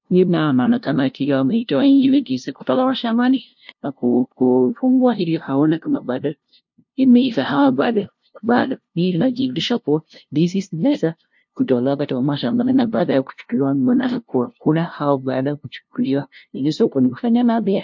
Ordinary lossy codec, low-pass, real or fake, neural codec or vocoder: MP3, 48 kbps; 7.2 kHz; fake; codec, 16 kHz, 0.5 kbps, FunCodec, trained on LibriTTS, 25 frames a second